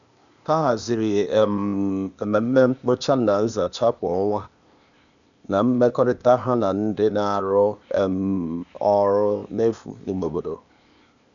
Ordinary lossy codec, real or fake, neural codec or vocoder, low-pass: none; fake; codec, 16 kHz, 0.8 kbps, ZipCodec; 7.2 kHz